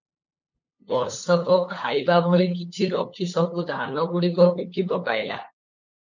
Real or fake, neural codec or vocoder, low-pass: fake; codec, 16 kHz, 8 kbps, FunCodec, trained on LibriTTS, 25 frames a second; 7.2 kHz